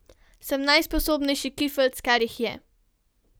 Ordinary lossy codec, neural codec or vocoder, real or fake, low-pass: none; none; real; none